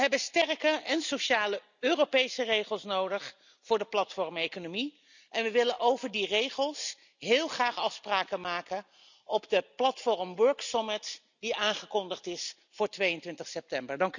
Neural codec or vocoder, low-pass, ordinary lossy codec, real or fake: none; 7.2 kHz; none; real